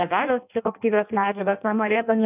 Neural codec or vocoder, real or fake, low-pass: codec, 16 kHz in and 24 kHz out, 0.6 kbps, FireRedTTS-2 codec; fake; 3.6 kHz